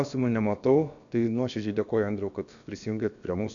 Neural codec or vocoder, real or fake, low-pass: codec, 16 kHz, about 1 kbps, DyCAST, with the encoder's durations; fake; 7.2 kHz